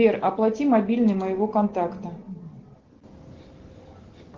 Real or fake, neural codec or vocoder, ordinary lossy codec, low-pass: real; none; Opus, 16 kbps; 7.2 kHz